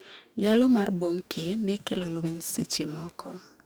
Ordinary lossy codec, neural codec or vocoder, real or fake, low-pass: none; codec, 44.1 kHz, 2.6 kbps, DAC; fake; none